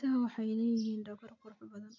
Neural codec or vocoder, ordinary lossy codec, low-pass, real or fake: none; none; 7.2 kHz; real